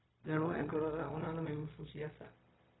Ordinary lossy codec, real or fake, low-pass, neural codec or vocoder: AAC, 16 kbps; fake; 7.2 kHz; codec, 16 kHz, 0.4 kbps, LongCat-Audio-Codec